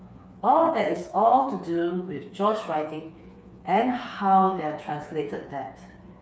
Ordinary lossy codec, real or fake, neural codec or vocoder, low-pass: none; fake; codec, 16 kHz, 4 kbps, FreqCodec, smaller model; none